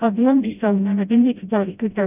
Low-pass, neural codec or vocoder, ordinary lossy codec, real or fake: 3.6 kHz; codec, 16 kHz, 0.5 kbps, FreqCodec, smaller model; none; fake